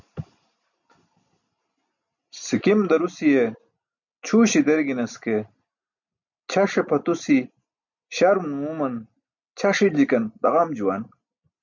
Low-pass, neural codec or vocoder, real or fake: 7.2 kHz; none; real